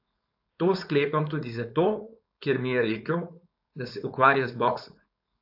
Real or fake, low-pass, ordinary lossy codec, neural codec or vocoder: fake; 5.4 kHz; AAC, 48 kbps; codec, 16 kHz, 4.8 kbps, FACodec